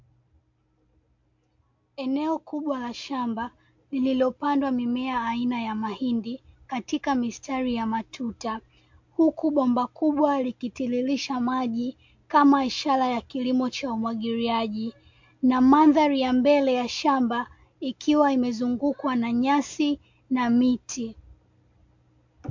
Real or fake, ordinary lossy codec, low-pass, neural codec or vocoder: real; MP3, 48 kbps; 7.2 kHz; none